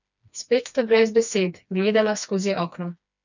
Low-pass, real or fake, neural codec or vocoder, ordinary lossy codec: 7.2 kHz; fake; codec, 16 kHz, 2 kbps, FreqCodec, smaller model; none